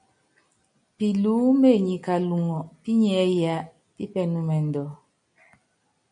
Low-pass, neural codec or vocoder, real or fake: 9.9 kHz; none; real